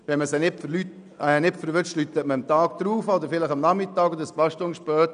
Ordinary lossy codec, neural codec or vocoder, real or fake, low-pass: none; none; real; 9.9 kHz